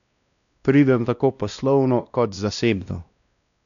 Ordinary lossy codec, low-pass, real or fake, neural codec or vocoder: none; 7.2 kHz; fake; codec, 16 kHz, 1 kbps, X-Codec, WavLM features, trained on Multilingual LibriSpeech